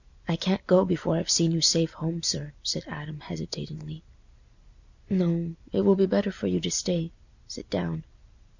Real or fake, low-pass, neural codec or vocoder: real; 7.2 kHz; none